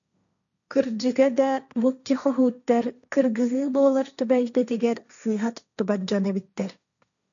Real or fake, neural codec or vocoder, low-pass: fake; codec, 16 kHz, 1.1 kbps, Voila-Tokenizer; 7.2 kHz